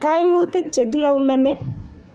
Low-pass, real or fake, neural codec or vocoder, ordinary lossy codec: none; fake; codec, 24 kHz, 1 kbps, SNAC; none